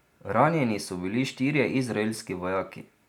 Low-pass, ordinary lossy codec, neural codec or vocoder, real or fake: 19.8 kHz; none; none; real